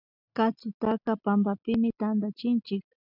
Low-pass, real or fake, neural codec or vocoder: 5.4 kHz; real; none